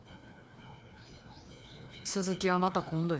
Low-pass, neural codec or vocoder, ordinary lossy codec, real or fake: none; codec, 16 kHz, 2 kbps, FreqCodec, larger model; none; fake